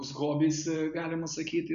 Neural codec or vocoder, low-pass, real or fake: none; 7.2 kHz; real